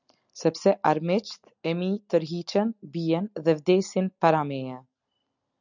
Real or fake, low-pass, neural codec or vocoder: real; 7.2 kHz; none